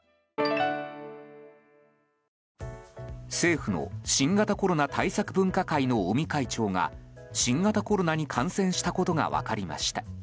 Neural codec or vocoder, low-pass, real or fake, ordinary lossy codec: none; none; real; none